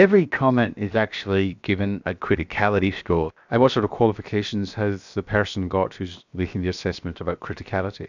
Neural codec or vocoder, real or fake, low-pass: codec, 16 kHz, 0.7 kbps, FocalCodec; fake; 7.2 kHz